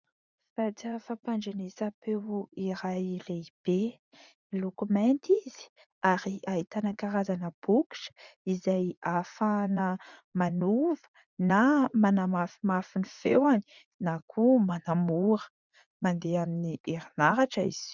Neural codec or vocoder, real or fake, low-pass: vocoder, 44.1 kHz, 80 mel bands, Vocos; fake; 7.2 kHz